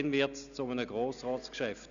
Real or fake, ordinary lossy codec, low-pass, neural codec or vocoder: real; AAC, 96 kbps; 7.2 kHz; none